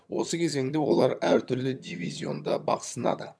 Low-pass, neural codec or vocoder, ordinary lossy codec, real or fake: none; vocoder, 22.05 kHz, 80 mel bands, HiFi-GAN; none; fake